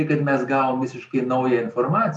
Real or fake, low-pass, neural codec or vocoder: real; 10.8 kHz; none